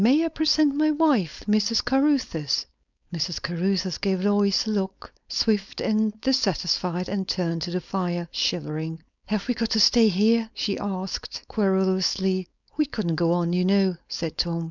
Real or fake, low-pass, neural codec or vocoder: fake; 7.2 kHz; codec, 16 kHz, 4.8 kbps, FACodec